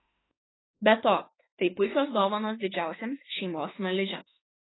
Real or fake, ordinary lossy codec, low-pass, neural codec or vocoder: fake; AAC, 16 kbps; 7.2 kHz; autoencoder, 48 kHz, 32 numbers a frame, DAC-VAE, trained on Japanese speech